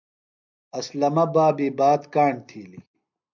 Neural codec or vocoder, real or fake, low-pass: none; real; 7.2 kHz